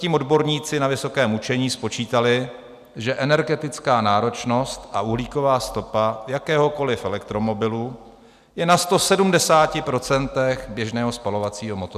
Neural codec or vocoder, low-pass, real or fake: none; 14.4 kHz; real